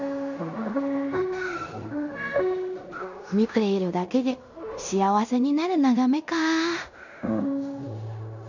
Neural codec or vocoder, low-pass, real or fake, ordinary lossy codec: codec, 16 kHz in and 24 kHz out, 0.9 kbps, LongCat-Audio-Codec, fine tuned four codebook decoder; 7.2 kHz; fake; none